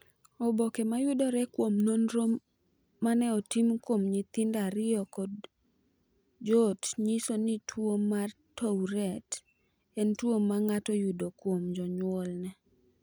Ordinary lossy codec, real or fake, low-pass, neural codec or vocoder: none; real; none; none